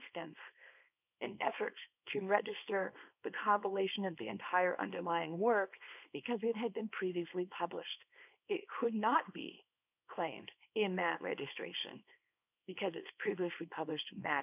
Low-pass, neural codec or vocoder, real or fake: 3.6 kHz; codec, 24 kHz, 0.9 kbps, WavTokenizer, small release; fake